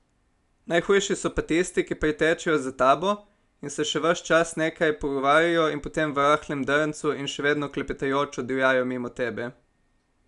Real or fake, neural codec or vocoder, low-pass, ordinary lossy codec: real; none; 10.8 kHz; none